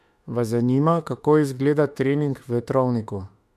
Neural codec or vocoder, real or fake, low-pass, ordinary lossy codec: autoencoder, 48 kHz, 32 numbers a frame, DAC-VAE, trained on Japanese speech; fake; 14.4 kHz; MP3, 64 kbps